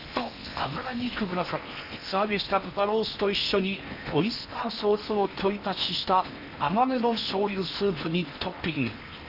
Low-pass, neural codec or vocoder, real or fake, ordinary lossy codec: 5.4 kHz; codec, 16 kHz in and 24 kHz out, 0.8 kbps, FocalCodec, streaming, 65536 codes; fake; none